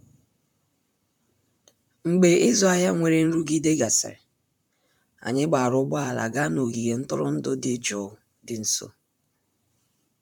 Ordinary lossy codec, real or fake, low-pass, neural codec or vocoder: none; fake; none; vocoder, 44.1 kHz, 128 mel bands, Pupu-Vocoder